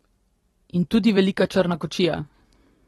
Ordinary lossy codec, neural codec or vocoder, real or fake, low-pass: AAC, 32 kbps; vocoder, 44.1 kHz, 128 mel bands every 256 samples, BigVGAN v2; fake; 19.8 kHz